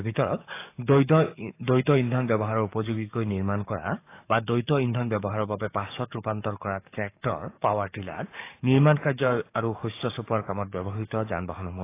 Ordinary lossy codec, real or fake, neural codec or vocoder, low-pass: AAC, 24 kbps; fake; codec, 44.1 kHz, 7.8 kbps, DAC; 3.6 kHz